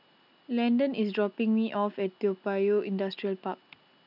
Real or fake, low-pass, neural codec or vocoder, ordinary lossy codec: real; 5.4 kHz; none; none